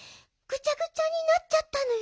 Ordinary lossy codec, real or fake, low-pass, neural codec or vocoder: none; real; none; none